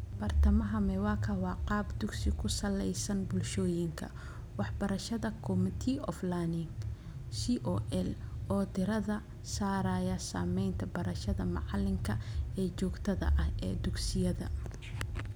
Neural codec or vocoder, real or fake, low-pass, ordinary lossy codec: none; real; none; none